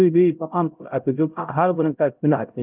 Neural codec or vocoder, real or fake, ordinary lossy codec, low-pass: codec, 16 kHz, 0.5 kbps, X-Codec, HuBERT features, trained on LibriSpeech; fake; Opus, 32 kbps; 3.6 kHz